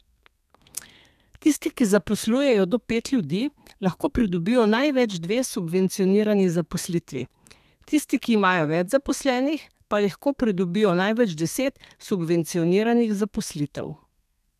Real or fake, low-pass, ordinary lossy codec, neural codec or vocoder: fake; 14.4 kHz; none; codec, 32 kHz, 1.9 kbps, SNAC